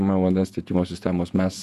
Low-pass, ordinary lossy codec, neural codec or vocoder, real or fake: 14.4 kHz; Opus, 64 kbps; vocoder, 48 kHz, 128 mel bands, Vocos; fake